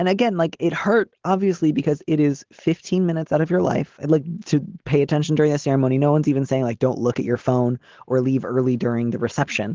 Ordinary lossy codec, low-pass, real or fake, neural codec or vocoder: Opus, 16 kbps; 7.2 kHz; real; none